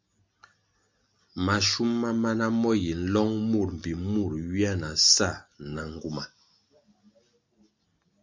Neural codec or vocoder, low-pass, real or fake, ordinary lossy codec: none; 7.2 kHz; real; MP3, 64 kbps